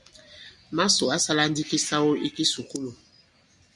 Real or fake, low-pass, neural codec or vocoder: real; 10.8 kHz; none